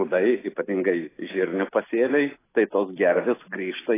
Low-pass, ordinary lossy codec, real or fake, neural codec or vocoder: 3.6 kHz; AAC, 16 kbps; real; none